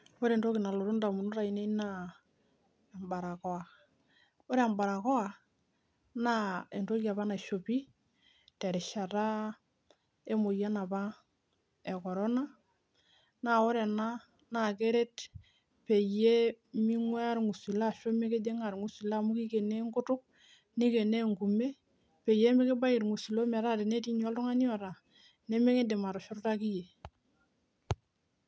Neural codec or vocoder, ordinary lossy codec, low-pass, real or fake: none; none; none; real